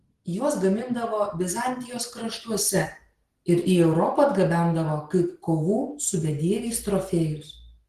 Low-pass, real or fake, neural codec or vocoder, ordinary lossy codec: 14.4 kHz; real; none; Opus, 16 kbps